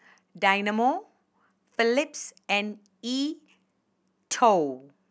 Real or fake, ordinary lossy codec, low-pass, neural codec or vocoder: real; none; none; none